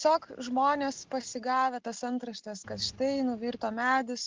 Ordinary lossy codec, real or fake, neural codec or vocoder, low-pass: Opus, 16 kbps; real; none; 7.2 kHz